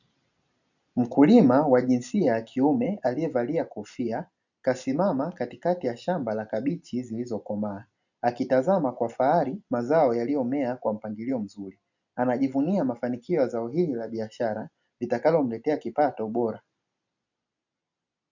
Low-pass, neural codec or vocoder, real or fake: 7.2 kHz; none; real